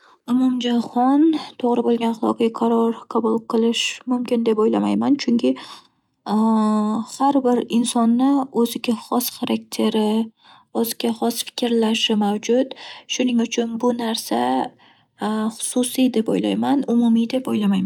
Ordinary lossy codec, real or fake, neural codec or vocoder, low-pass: none; real; none; 19.8 kHz